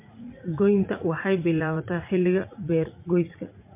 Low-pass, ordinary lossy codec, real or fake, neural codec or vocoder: 3.6 kHz; MP3, 24 kbps; fake; vocoder, 44.1 kHz, 80 mel bands, Vocos